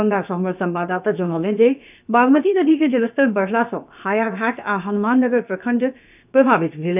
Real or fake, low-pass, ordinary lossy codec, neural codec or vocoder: fake; 3.6 kHz; none; codec, 16 kHz, about 1 kbps, DyCAST, with the encoder's durations